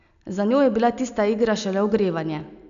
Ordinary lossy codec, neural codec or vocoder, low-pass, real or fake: none; none; 7.2 kHz; real